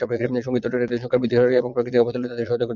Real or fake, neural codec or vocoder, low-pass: fake; vocoder, 22.05 kHz, 80 mel bands, Vocos; 7.2 kHz